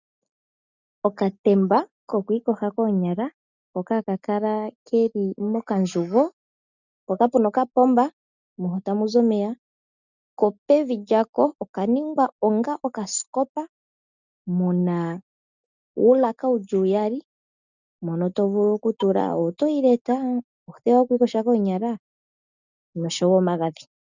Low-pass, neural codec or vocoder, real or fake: 7.2 kHz; none; real